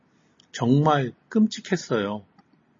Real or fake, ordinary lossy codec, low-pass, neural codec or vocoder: real; MP3, 32 kbps; 7.2 kHz; none